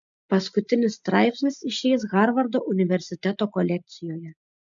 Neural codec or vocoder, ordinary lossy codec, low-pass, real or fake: none; MP3, 64 kbps; 7.2 kHz; real